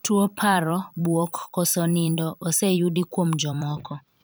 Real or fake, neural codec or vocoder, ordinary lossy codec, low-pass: fake; vocoder, 44.1 kHz, 128 mel bands every 256 samples, BigVGAN v2; none; none